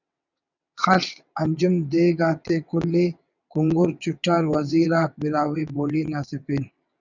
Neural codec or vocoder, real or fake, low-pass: vocoder, 22.05 kHz, 80 mel bands, WaveNeXt; fake; 7.2 kHz